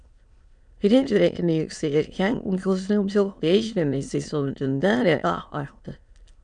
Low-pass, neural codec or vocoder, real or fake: 9.9 kHz; autoencoder, 22.05 kHz, a latent of 192 numbers a frame, VITS, trained on many speakers; fake